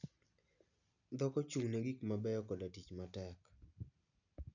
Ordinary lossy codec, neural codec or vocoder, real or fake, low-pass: none; none; real; 7.2 kHz